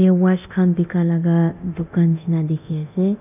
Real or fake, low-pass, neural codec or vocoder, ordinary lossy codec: fake; 3.6 kHz; codec, 24 kHz, 0.9 kbps, DualCodec; none